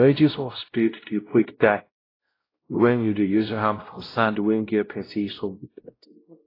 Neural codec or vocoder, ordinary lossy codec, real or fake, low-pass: codec, 16 kHz, 0.5 kbps, X-Codec, WavLM features, trained on Multilingual LibriSpeech; AAC, 24 kbps; fake; 5.4 kHz